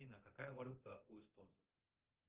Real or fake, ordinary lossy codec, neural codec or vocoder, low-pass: fake; Opus, 16 kbps; codec, 24 kHz, 0.9 kbps, DualCodec; 3.6 kHz